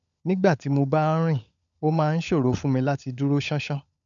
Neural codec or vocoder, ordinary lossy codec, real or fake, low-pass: codec, 16 kHz, 16 kbps, FunCodec, trained on LibriTTS, 50 frames a second; none; fake; 7.2 kHz